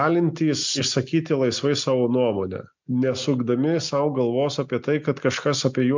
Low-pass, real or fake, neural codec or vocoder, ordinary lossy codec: 7.2 kHz; real; none; MP3, 64 kbps